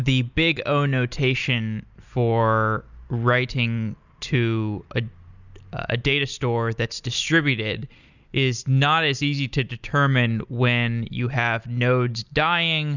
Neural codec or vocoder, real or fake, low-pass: none; real; 7.2 kHz